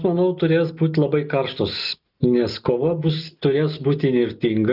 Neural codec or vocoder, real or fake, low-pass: none; real; 5.4 kHz